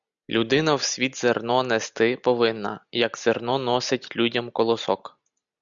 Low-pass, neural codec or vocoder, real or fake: 7.2 kHz; none; real